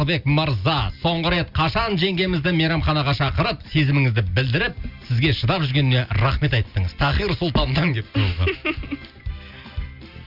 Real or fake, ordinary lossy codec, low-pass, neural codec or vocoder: real; none; 5.4 kHz; none